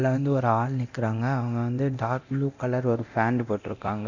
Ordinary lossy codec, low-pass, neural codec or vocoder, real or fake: none; 7.2 kHz; codec, 24 kHz, 0.9 kbps, DualCodec; fake